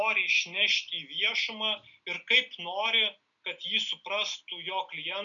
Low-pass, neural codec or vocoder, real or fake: 7.2 kHz; none; real